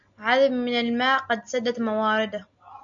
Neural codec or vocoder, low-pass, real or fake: none; 7.2 kHz; real